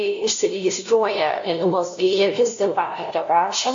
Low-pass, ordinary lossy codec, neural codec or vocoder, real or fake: 7.2 kHz; AAC, 48 kbps; codec, 16 kHz, 0.5 kbps, FunCodec, trained on LibriTTS, 25 frames a second; fake